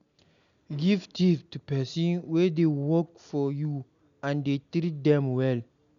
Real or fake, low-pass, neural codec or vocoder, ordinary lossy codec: real; 7.2 kHz; none; none